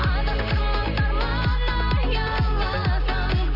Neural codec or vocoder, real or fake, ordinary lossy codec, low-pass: none; real; none; 5.4 kHz